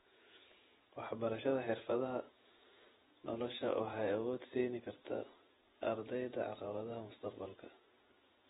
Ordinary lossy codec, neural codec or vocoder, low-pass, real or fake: AAC, 16 kbps; vocoder, 48 kHz, 128 mel bands, Vocos; 19.8 kHz; fake